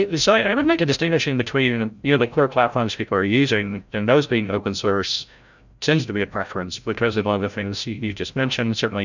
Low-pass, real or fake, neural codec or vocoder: 7.2 kHz; fake; codec, 16 kHz, 0.5 kbps, FreqCodec, larger model